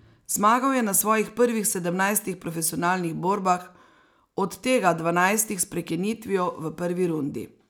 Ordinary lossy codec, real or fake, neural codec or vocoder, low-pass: none; real; none; none